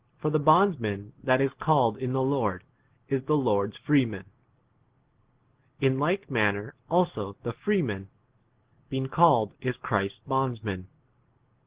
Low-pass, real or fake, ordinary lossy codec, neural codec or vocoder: 3.6 kHz; real; Opus, 16 kbps; none